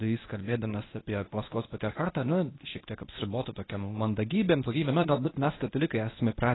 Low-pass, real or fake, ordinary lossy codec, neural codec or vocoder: 7.2 kHz; fake; AAC, 16 kbps; codec, 24 kHz, 0.9 kbps, WavTokenizer, medium speech release version 1